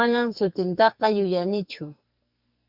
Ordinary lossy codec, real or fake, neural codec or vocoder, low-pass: Opus, 64 kbps; fake; codec, 44.1 kHz, 2.6 kbps, SNAC; 5.4 kHz